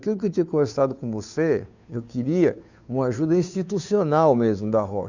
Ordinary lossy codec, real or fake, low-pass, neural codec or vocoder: none; fake; 7.2 kHz; codec, 16 kHz, 2 kbps, FunCodec, trained on Chinese and English, 25 frames a second